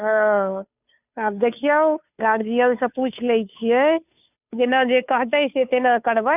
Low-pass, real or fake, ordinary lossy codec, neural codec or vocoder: 3.6 kHz; fake; none; codec, 16 kHz, 2 kbps, FunCodec, trained on Chinese and English, 25 frames a second